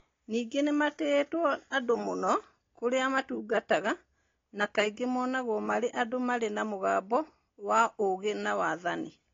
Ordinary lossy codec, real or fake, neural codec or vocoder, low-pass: AAC, 32 kbps; real; none; 7.2 kHz